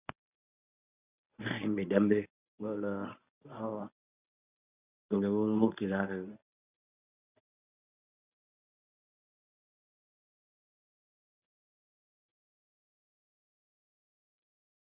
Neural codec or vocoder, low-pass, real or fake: codec, 24 kHz, 0.9 kbps, WavTokenizer, medium speech release version 1; 3.6 kHz; fake